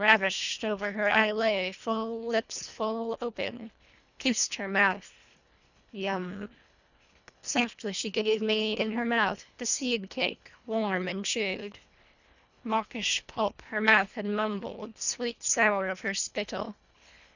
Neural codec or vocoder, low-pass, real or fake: codec, 24 kHz, 1.5 kbps, HILCodec; 7.2 kHz; fake